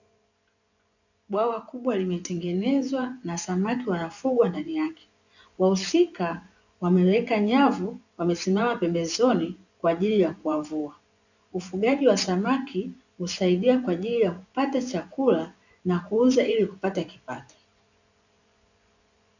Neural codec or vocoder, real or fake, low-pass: vocoder, 24 kHz, 100 mel bands, Vocos; fake; 7.2 kHz